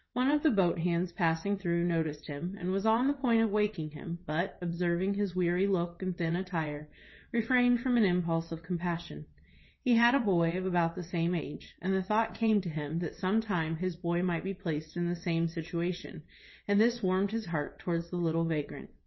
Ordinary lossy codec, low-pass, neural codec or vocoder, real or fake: MP3, 24 kbps; 7.2 kHz; vocoder, 22.05 kHz, 80 mel bands, WaveNeXt; fake